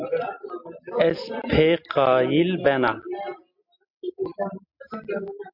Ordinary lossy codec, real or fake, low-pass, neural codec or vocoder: MP3, 48 kbps; real; 5.4 kHz; none